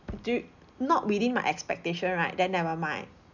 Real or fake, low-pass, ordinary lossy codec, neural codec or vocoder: real; 7.2 kHz; none; none